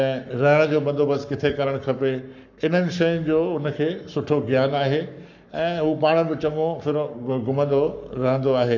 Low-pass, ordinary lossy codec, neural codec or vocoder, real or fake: 7.2 kHz; none; codec, 44.1 kHz, 7.8 kbps, Pupu-Codec; fake